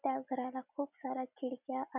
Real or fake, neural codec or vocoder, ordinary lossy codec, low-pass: real; none; none; 3.6 kHz